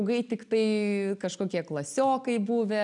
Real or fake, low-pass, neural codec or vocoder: real; 10.8 kHz; none